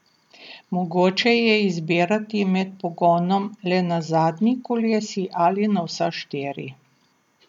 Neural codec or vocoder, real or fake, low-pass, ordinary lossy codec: none; real; 19.8 kHz; none